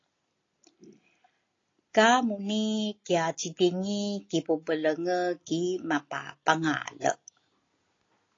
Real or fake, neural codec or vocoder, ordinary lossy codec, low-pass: real; none; AAC, 32 kbps; 7.2 kHz